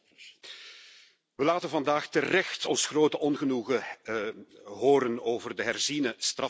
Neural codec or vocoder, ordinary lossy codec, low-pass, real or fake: none; none; none; real